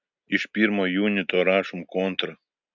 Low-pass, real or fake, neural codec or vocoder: 7.2 kHz; real; none